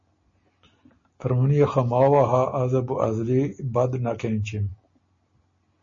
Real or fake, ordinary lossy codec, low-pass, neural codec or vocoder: real; MP3, 32 kbps; 7.2 kHz; none